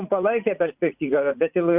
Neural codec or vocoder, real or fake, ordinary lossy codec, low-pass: vocoder, 44.1 kHz, 80 mel bands, Vocos; fake; Opus, 64 kbps; 3.6 kHz